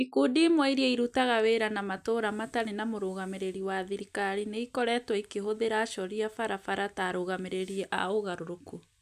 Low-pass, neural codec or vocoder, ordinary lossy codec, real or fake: 14.4 kHz; none; none; real